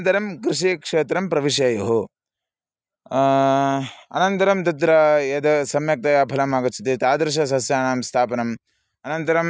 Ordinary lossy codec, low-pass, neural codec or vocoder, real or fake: none; none; none; real